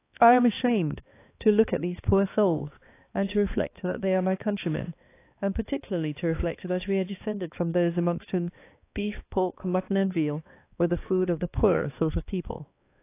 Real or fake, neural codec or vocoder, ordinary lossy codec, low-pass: fake; codec, 16 kHz, 2 kbps, X-Codec, HuBERT features, trained on balanced general audio; AAC, 24 kbps; 3.6 kHz